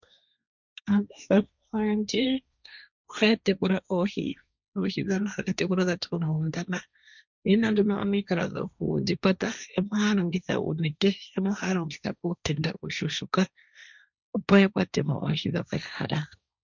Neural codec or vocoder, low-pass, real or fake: codec, 16 kHz, 1.1 kbps, Voila-Tokenizer; 7.2 kHz; fake